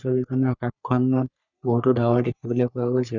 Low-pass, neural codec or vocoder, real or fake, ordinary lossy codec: 7.2 kHz; codec, 44.1 kHz, 3.4 kbps, Pupu-Codec; fake; none